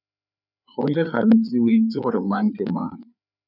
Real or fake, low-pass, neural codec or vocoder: fake; 5.4 kHz; codec, 16 kHz, 4 kbps, FreqCodec, larger model